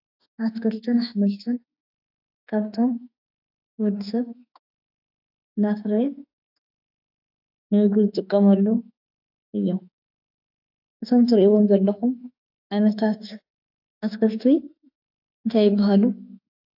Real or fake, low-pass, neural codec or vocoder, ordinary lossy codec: fake; 5.4 kHz; autoencoder, 48 kHz, 32 numbers a frame, DAC-VAE, trained on Japanese speech; AAC, 32 kbps